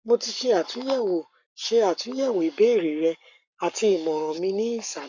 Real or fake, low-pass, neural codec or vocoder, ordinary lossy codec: fake; 7.2 kHz; codec, 44.1 kHz, 7.8 kbps, Pupu-Codec; none